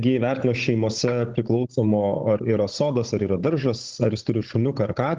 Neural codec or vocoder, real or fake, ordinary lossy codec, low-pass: codec, 16 kHz, 16 kbps, FunCodec, trained on Chinese and English, 50 frames a second; fake; Opus, 16 kbps; 7.2 kHz